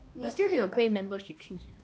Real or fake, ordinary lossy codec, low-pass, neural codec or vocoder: fake; none; none; codec, 16 kHz, 1 kbps, X-Codec, HuBERT features, trained on balanced general audio